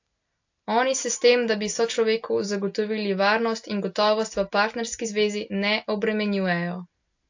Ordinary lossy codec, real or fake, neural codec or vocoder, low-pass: AAC, 48 kbps; real; none; 7.2 kHz